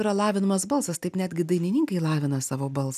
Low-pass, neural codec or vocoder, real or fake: 14.4 kHz; none; real